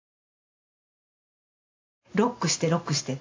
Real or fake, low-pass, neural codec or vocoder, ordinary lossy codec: real; 7.2 kHz; none; AAC, 32 kbps